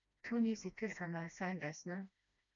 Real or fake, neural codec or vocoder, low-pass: fake; codec, 16 kHz, 1 kbps, FreqCodec, smaller model; 7.2 kHz